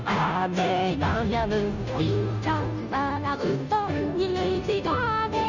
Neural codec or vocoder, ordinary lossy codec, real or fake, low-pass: codec, 16 kHz, 0.5 kbps, FunCodec, trained on Chinese and English, 25 frames a second; none; fake; 7.2 kHz